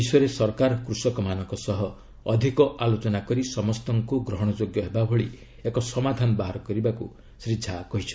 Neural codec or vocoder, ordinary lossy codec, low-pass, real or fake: none; none; none; real